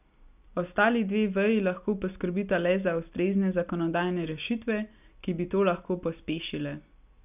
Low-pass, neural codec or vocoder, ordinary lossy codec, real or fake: 3.6 kHz; none; none; real